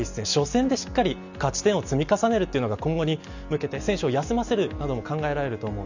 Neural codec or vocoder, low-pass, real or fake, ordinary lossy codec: none; 7.2 kHz; real; none